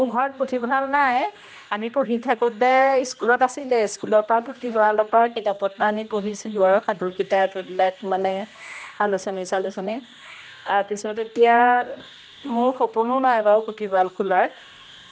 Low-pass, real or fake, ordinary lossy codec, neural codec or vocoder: none; fake; none; codec, 16 kHz, 1 kbps, X-Codec, HuBERT features, trained on general audio